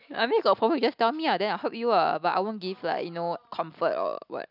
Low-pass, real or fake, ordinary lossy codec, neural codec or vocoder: 5.4 kHz; real; none; none